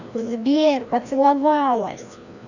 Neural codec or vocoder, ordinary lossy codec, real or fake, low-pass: codec, 16 kHz, 1 kbps, FreqCodec, larger model; none; fake; 7.2 kHz